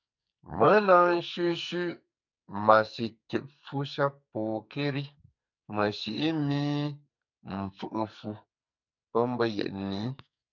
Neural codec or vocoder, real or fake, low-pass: codec, 44.1 kHz, 2.6 kbps, SNAC; fake; 7.2 kHz